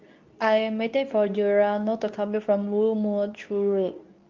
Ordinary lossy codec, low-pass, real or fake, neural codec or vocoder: Opus, 24 kbps; 7.2 kHz; fake; codec, 24 kHz, 0.9 kbps, WavTokenizer, medium speech release version 2